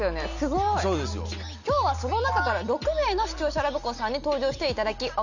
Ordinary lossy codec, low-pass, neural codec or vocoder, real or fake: none; 7.2 kHz; none; real